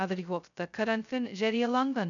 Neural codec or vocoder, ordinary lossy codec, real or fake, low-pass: codec, 16 kHz, 0.2 kbps, FocalCodec; none; fake; 7.2 kHz